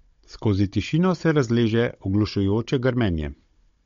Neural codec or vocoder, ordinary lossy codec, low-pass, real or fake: codec, 16 kHz, 16 kbps, FunCodec, trained on Chinese and English, 50 frames a second; MP3, 48 kbps; 7.2 kHz; fake